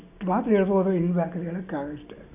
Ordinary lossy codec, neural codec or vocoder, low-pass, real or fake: none; none; 3.6 kHz; real